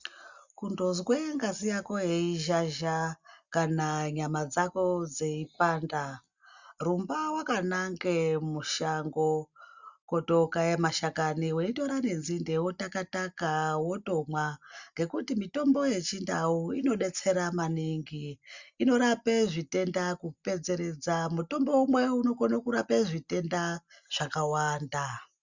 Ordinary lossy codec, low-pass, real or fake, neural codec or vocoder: Opus, 64 kbps; 7.2 kHz; real; none